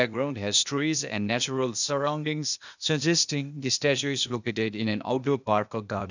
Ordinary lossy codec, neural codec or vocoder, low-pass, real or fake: none; codec, 16 kHz, 0.8 kbps, ZipCodec; 7.2 kHz; fake